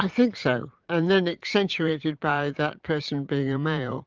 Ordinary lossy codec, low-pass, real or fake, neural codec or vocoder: Opus, 32 kbps; 7.2 kHz; fake; vocoder, 44.1 kHz, 80 mel bands, Vocos